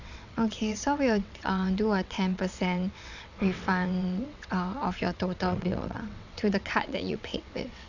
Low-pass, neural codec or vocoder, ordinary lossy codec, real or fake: 7.2 kHz; vocoder, 22.05 kHz, 80 mel bands, WaveNeXt; none; fake